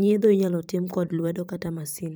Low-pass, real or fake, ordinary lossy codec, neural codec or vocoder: none; fake; none; vocoder, 44.1 kHz, 128 mel bands every 512 samples, BigVGAN v2